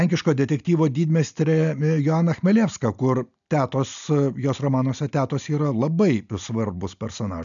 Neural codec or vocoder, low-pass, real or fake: none; 7.2 kHz; real